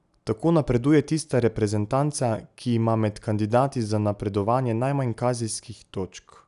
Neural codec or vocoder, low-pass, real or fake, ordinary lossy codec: none; 10.8 kHz; real; none